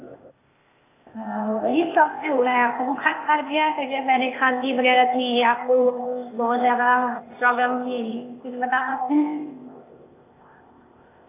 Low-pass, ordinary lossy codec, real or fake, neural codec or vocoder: 3.6 kHz; AAC, 24 kbps; fake; codec, 16 kHz, 0.8 kbps, ZipCodec